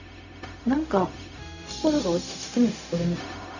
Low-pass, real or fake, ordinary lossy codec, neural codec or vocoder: 7.2 kHz; fake; none; codec, 16 kHz, 0.4 kbps, LongCat-Audio-Codec